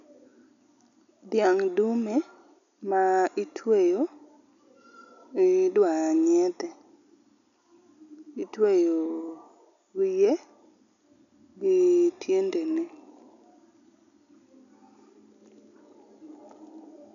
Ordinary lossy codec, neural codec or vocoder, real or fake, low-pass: none; none; real; 7.2 kHz